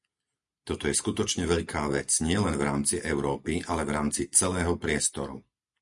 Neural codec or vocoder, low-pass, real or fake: none; 10.8 kHz; real